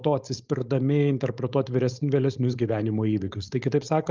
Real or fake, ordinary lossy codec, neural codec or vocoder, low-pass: fake; Opus, 32 kbps; codec, 16 kHz, 4.8 kbps, FACodec; 7.2 kHz